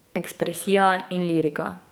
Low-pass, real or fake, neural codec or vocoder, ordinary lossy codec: none; fake; codec, 44.1 kHz, 2.6 kbps, SNAC; none